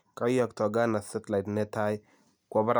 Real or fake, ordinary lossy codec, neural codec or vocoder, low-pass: real; none; none; none